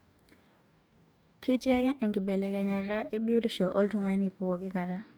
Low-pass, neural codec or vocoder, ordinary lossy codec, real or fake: none; codec, 44.1 kHz, 2.6 kbps, DAC; none; fake